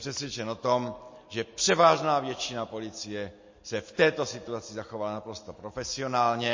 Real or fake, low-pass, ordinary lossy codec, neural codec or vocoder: real; 7.2 kHz; MP3, 32 kbps; none